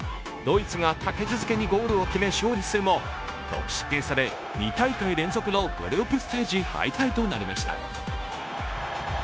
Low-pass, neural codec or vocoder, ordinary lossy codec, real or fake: none; codec, 16 kHz, 0.9 kbps, LongCat-Audio-Codec; none; fake